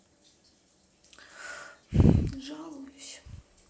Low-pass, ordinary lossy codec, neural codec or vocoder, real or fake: none; none; none; real